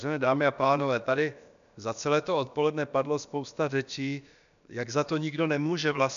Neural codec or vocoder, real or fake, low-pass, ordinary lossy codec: codec, 16 kHz, about 1 kbps, DyCAST, with the encoder's durations; fake; 7.2 kHz; MP3, 96 kbps